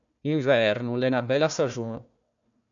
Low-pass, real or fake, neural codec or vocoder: 7.2 kHz; fake; codec, 16 kHz, 1 kbps, FunCodec, trained on Chinese and English, 50 frames a second